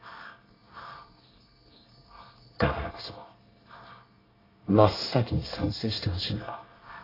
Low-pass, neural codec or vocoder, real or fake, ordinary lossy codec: 5.4 kHz; codec, 24 kHz, 1 kbps, SNAC; fake; AAC, 24 kbps